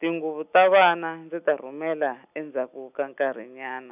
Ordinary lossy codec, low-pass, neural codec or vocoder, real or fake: none; 3.6 kHz; none; real